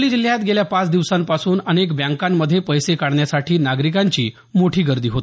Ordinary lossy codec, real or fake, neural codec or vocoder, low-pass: none; real; none; none